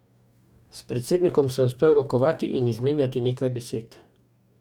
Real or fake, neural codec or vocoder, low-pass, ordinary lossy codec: fake; codec, 44.1 kHz, 2.6 kbps, DAC; 19.8 kHz; none